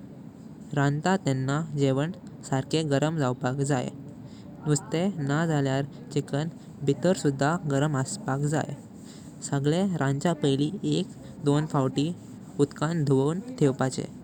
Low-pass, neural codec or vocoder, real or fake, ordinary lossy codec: 19.8 kHz; none; real; none